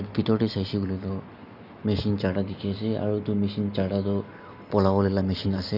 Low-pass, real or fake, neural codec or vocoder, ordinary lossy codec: 5.4 kHz; real; none; none